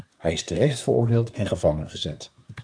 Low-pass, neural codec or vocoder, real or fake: 9.9 kHz; codec, 24 kHz, 1 kbps, SNAC; fake